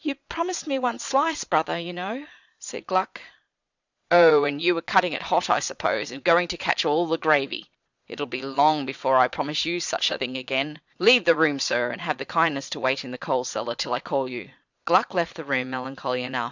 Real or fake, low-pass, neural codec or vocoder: fake; 7.2 kHz; vocoder, 22.05 kHz, 80 mel bands, Vocos